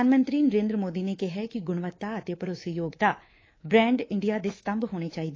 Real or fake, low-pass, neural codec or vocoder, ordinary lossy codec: fake; 7.2 kHz; codec, 24 kHz, 3.1 kbps, DualCodec; AAC, 32 kbps